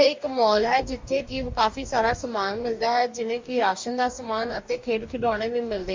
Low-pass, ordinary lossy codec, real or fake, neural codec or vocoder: 7.2 kHz; MP3, 48 kbps; fake; codec, 44.1 kHz, 2.6 kbps, DAC